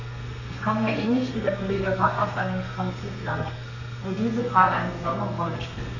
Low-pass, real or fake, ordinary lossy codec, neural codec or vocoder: 7.2 kHz; fake; none; codec, 32 kHz, 1.9 kbps, SNAC